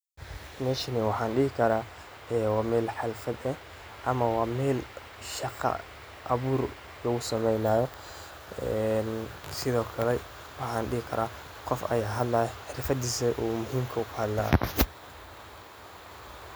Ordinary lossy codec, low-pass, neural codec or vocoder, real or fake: none; none; none; real